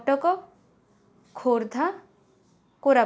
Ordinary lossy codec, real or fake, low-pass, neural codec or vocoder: none; real; none; none